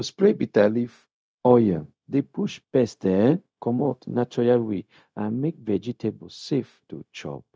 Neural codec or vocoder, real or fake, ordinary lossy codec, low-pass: codec, 16 kHz, 0.4 kbps, LongCat-Audio-Codec; fake; none; none